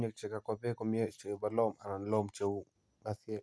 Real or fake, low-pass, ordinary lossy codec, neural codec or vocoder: real; none; none; none